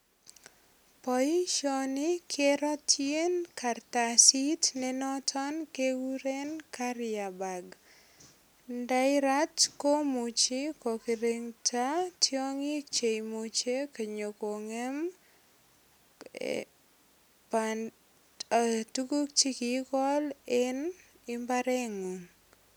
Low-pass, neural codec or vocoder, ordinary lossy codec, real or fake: none; none; none; real